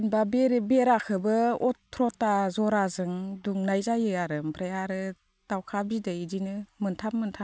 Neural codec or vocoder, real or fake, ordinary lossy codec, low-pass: none; real; none; none